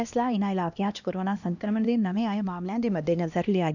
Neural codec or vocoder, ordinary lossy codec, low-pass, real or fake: codec, 16 kHz, 1 kbps, X-Codec, HuBERT features, trained on LibriSpeech; none; 7.2 kHz; fake